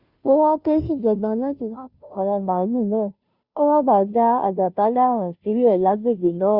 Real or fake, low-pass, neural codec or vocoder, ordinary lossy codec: fake; 5.4 kHz; codec, 16 kHz, 0.5 kbps, FunCodec, trained on Chinese and English, 25 frames a second; Opus, 64 kbps